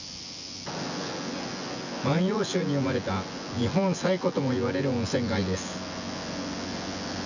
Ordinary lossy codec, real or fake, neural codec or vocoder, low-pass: none; fake; vocoder, 24 kHz, 100 mel bands, Vocos; 7.2 kHz